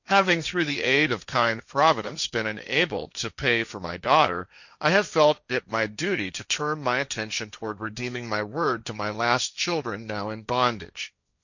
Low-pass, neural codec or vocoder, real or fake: 7.2 kHz; codec, 16 kHz, 1.1 kbps, Voila-Tokenizer; fake